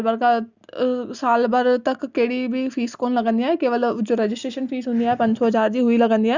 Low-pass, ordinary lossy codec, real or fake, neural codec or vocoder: 7.2 kHz; Opus, 64 kbps; real; none